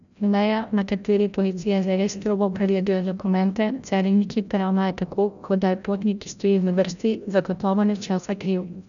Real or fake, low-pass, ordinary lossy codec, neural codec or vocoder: fake; 7.2 kHz; none; codec, 16 kHz, 0.5 kbps, FreqCodec, larger model